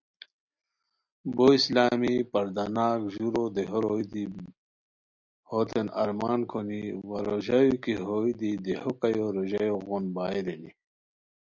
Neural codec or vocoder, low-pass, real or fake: none; 7.2 kHz; real